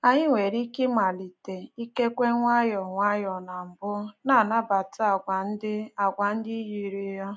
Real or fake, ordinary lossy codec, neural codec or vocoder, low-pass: real; none; none; none